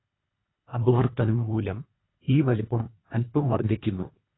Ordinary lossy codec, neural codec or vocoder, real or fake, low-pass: AAC, 16 kbps; codec, 24 kHz, 1.5 kbps, HILCodec; fake; 7.2 kHz